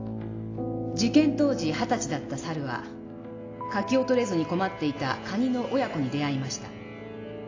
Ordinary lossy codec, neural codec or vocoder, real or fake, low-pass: AAC, 32 kbps; none; real; 7.2 kHz